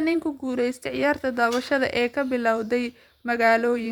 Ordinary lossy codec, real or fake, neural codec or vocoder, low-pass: none; fake; vocoder, 44.1 kHz, 128 mel bands every 512 samples, BigVGAN v2; 19.8 kHz